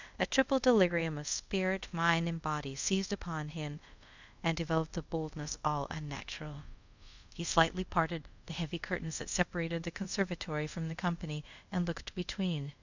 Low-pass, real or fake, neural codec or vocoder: 7.2 kHz; fake; codec, 24 kHz, 0.5 kbps, DualCodec